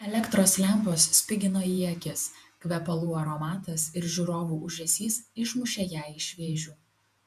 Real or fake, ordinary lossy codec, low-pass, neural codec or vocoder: fake; MP3, 96 kbps; 14.4 kHz; vocoder, 48 kHz, 128 mel bands, Vocos